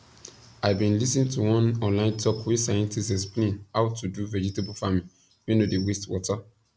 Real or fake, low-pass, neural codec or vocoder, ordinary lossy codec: real; none; none; none